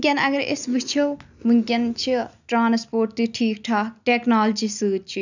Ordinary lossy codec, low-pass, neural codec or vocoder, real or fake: none; 7.2 kHz; none; real